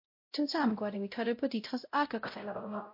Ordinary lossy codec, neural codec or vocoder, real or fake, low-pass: MP3, 32 kbps; codec, 16 kHz, 0.5 kbps, X-Codec, WavLM features, trained on Multilingual LibriSpeech; fake; 5.4 kHz